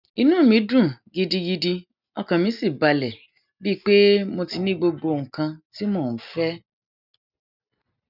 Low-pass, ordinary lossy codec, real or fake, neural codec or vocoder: 5.4 kHz; none; real; none